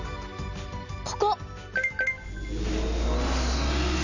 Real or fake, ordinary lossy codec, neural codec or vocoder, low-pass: real; none; none; 7.2 kHz